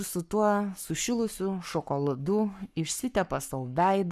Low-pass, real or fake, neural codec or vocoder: 14.4 kHz; fake; codec, 44.1 kHz, 7.8 kbps, Pupu-Codec